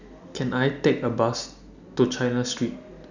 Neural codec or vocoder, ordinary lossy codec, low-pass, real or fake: none; none; 7.2 kHz; real